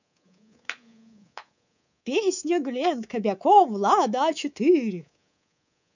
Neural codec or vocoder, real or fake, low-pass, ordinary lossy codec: codec, 24 kHz, 3.1 kbps, DualCodec; fake; 7.2 kHz; none